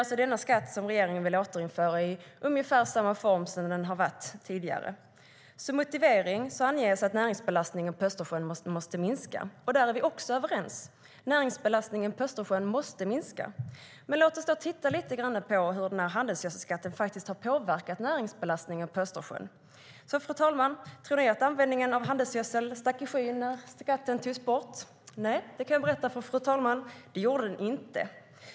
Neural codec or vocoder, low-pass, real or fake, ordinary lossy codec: none; none; real; none